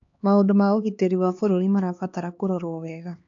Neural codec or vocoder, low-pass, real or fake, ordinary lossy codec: codec, 16 kHz, 2 kbps, X-Codec, HuBERT features, trained on LibriSpeech; 7.2 kHz; fake; AAC, 64 kbps